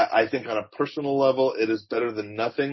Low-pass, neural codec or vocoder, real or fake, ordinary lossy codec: 7.2 kHz; none; real; MP3, 24 kbps